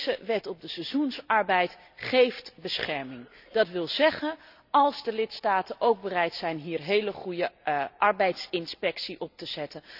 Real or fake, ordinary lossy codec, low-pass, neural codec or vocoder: real; none; 5.4 kHz; none